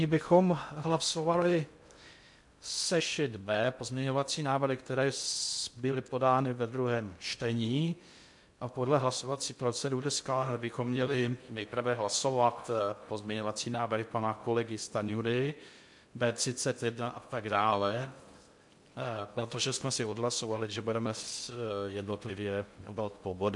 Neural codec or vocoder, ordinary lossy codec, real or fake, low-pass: codec, 16 kHz in and 24 kHz out, 0.6 kbps, FocalCodec, streaming, 2048 codes; MP3, 64 kbps; fake; 10.8 kHz